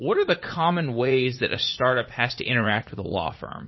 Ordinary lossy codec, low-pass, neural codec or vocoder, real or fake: MP3, 24 kbps; 7.2 kHz; vocoder, 44.1 kHz, 80 mel bands, Vocos; fake